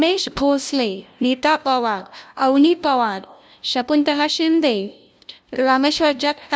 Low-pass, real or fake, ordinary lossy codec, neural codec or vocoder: none; fake; none; codec, 16 kHz, 0.5 kbps, FunCodec, trained on LibriTTS, 25 frames a second